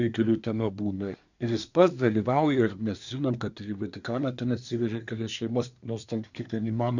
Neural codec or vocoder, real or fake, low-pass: codec, 24 kHz, 1 kbps, SNAC; fake; 7.2 kHz